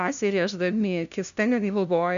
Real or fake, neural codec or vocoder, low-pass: fake; codec, 16 kHz, 0.5 kbps, FunCodec, trained on LibriTTS, 25 frames a second; 7.2 kHz